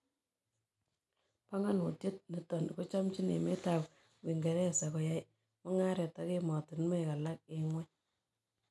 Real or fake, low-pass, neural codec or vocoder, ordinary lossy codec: real; none; none; none